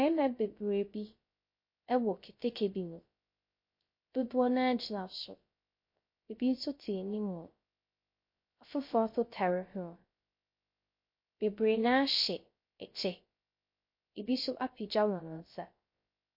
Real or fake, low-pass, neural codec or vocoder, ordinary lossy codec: fake; 5.4 kHz; codec, 16 kHz, 0.3 kbps, FocalCodec; MP3, 32 kbps